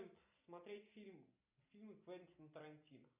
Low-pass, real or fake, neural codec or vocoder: 3.6 kHz; real; none